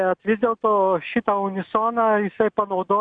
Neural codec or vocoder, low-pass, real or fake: none; 9.9 kHz; real